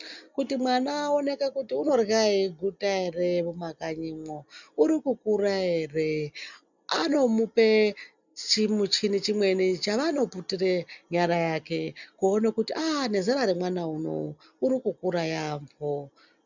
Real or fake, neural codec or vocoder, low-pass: real; none; 7.2 kHz